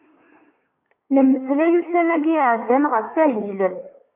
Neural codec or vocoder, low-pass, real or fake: codec, 24 kHz, 1 kbps, SNAC; 3.6 kHz; fake